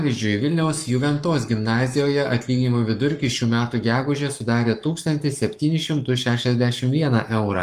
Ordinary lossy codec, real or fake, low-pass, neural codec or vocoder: Opus, 32 kbps; fake; 14.4 kHz; codec, 44.1 kHz, 7.8 kbps, Pupu-Codec